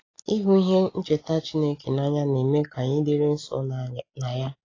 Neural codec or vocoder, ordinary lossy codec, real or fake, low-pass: none; AAC, 32 kbps; real; 7.2 kHz